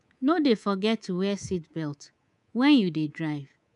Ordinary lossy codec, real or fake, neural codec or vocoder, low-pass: MP3, 96 kbps; fake; codec, 24 kHz, 3.1 kbps, DualCodec; 10.8 kHz